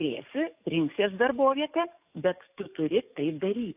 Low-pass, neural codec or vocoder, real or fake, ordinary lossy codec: 3.6 kHz; codec, 44.1 kHz, 7.8 kbps, DAC; fake; AAC, 32 kbps